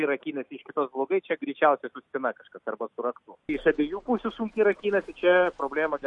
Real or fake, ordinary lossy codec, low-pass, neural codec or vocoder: real; MP3, 48 kbps; 10.8 kHz; none